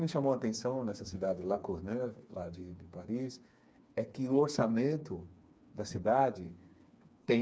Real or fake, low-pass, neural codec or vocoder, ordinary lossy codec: fake; none; codec, 16 kHz, 4 kbps, FreqCodec, smaller model; none